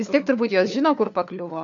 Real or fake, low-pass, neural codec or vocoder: fake; 7.2 kHz; codec, 16 kHz, 4 kbps, FunCodec, trained on LibriTTS, 50 frames a second